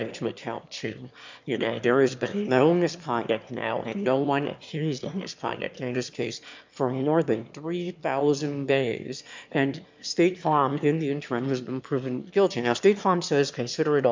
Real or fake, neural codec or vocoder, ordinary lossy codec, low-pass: fake; autoencoder, 22.05 kHz, a latent of 192 numbers a frame, VITS, trained on one speaker; MP3, 64 kbps; 7.2 kHz